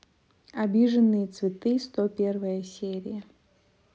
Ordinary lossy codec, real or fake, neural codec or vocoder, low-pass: none; real; none; none